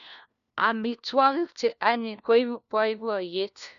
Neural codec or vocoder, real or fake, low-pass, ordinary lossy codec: codec, 16 kHz, 1 kbps, FunCodec, trained on LibriTTS, 50 frames a second; fake; 7.2 kHz; none